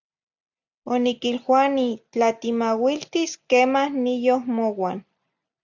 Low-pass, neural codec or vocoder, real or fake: 7.2 kHz; none; real